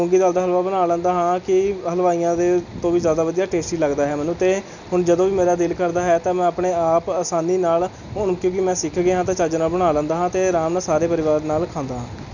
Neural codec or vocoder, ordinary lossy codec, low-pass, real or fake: none; none; 7.2 kHz; real